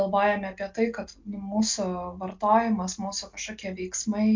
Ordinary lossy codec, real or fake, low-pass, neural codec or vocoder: MP3, 64 kbps; real; 7.2 kHz; none